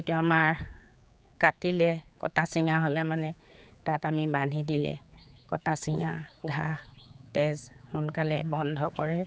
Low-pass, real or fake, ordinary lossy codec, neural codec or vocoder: none; fake; none; codec, 16 kHz, 4 kbps, X-Codec, HuBERT features, trained on general audio